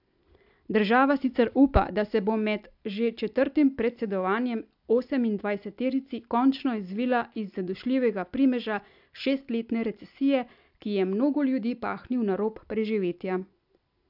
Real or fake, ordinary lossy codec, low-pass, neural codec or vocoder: real; AAC, 48 kbps; 5.4 kHz; none